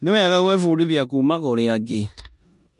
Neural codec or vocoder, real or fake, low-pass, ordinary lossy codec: codec, 16 kHz in and 24 kHz out, 0.9 kbps, LongCat-Audio-Codec, four codebook decoder; fake; 10.8 kHz; MP3, 64 kbps